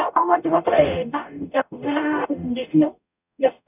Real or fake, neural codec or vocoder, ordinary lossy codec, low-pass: fake; codec, 44.1 kHz, 0.9 kbps, DAC; none; 3.6 kHz